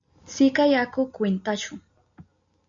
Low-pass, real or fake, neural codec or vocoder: 7.2 kHz; real; none